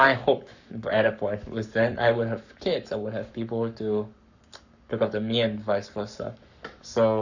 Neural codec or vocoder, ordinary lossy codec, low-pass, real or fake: codec, 44.1 kHz, 7.8 kbps, Pupu-Codec; none; 7.2 kHz; fake